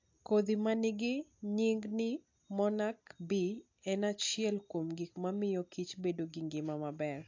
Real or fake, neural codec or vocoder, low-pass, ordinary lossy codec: real; none; 7.2 kHz; AAC, 48 kbps